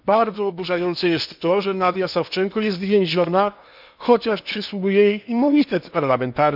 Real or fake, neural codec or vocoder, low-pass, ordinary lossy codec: fake; codec, 16 kHz in and 24 kHz out, 0.8 kbps, FocalCodec, streaming, 65536 codes; 5.4 kHz; none